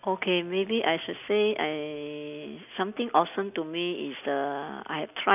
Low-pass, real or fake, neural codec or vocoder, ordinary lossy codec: 3.6 kHz; real; none; none